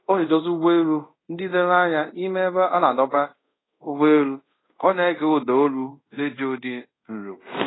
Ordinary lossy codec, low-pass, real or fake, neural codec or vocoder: AAC, 16 kbps; 7.2 kHz; fake; codec, 24 kHz, 0.5 kbps, DualCodec